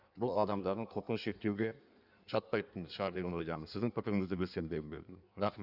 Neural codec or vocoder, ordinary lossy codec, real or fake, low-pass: codec, 16 kHz in and 24 kHz out, 1.1 kbps, FireRedTTS-2 codec; none; fake; 5.4 kHz